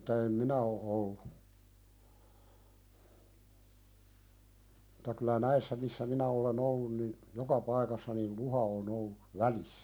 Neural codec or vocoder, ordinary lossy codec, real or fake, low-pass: none; none; real; none